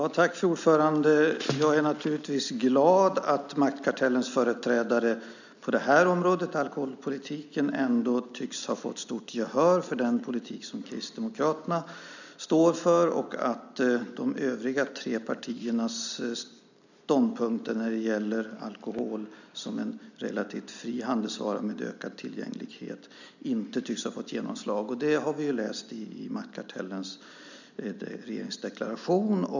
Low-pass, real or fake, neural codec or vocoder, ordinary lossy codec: 7.2 kHz; real; none; none